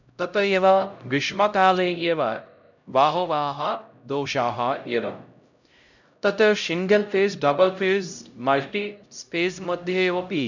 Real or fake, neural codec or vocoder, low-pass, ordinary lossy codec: fake; codec, 16 kHz, 0.5 kbps, X-Codec, HuBERT features, trained on LibriSpeech; 7.2 kHz; none